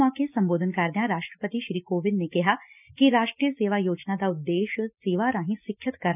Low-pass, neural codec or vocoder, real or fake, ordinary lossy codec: 3.6 kHz; none; real; none